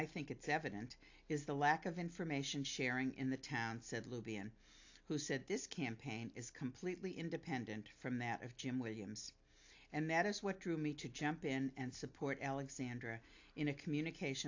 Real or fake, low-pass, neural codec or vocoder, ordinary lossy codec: real; 7.2 kHz; none; AAC, 48 kbps